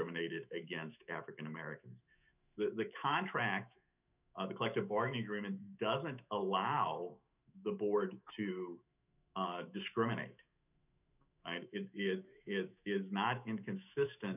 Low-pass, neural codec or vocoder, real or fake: 3.6 kHz; autoencoder, 48 kHz, 128 numbers a frame, DAC-VAE, trained on Japanese speech; fake